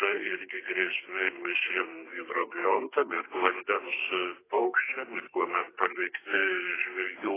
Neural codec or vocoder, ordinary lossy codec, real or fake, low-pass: codec, 32 kHz, 1.9 kbps, SNAC; AAC, 16 kbps; fake; 3.6 kHz